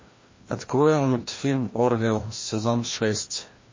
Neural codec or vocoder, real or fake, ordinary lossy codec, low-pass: codec, 16 kHz, 1 kbps, FreqCodec, larger model; fake; MP3, 32 kbps; 7.2 kHz